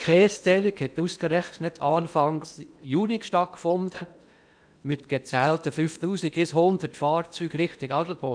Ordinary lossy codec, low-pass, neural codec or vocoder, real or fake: none; 9.9 kHz; codec, 16 kHz in and 24 kHz out, 0.8 kbps, FocalCodec, streaming, 65536 codes; fake